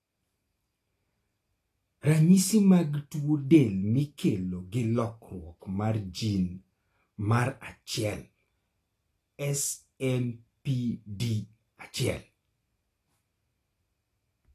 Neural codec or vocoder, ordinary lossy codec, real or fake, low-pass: none; AAC, 48 kbps; real; 14.4 kHz